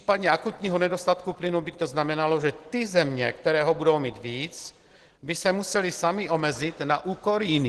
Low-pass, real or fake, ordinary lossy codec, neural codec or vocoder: 10.8 kHz; real; Opus, 16 kbps; none